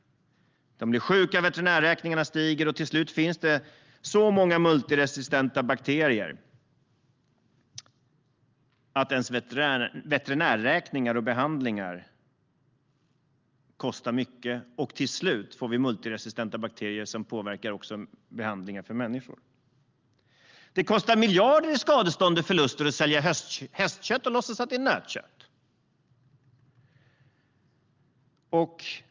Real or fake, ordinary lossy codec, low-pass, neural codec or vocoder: real; Opus, 24 kbps; 7.2 kHz; none